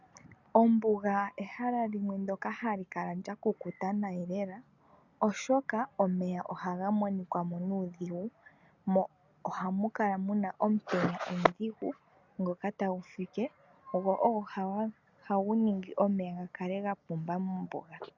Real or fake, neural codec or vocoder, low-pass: real; none; 7.2 kHz